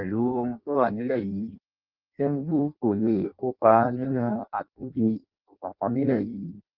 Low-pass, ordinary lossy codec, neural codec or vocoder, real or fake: 5.4 kHz; Opus, 24 kbps; codec, 16 kHz in and 24 kHz out, 0.6 kbps, FireRedTTS-2 codec; fake